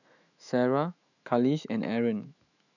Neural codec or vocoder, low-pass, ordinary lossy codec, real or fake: autoencoder, 48 kHz, 128 numbers a frame, DAC-VAE, trained on Japanese speech; 7.2 kHz; none; fake